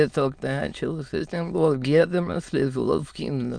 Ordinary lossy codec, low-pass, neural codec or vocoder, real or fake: Opus, 32 kbps; 9.9 kHz; autoencoder, 22.05 kHz, a latent of 192 numbers a frame, VITS, trained on many speakers; fake